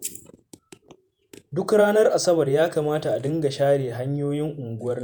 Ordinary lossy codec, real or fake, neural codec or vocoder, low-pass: none; fake; vocoder, 48 kHz, 128 mel bands, Vocos; none